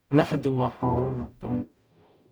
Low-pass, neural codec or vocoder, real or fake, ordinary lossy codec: none; codec, 44.1 kHz, 0.9 kbps, DAC; fake; none